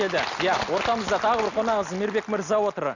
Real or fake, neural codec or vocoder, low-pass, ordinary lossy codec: real; none; 7.2 kHz; none